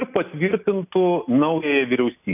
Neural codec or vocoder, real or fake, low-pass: none; real; 3.6 kHz